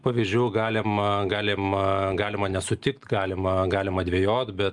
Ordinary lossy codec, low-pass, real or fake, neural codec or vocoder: Opus, 32 kbps; 10.8 kHz; real; none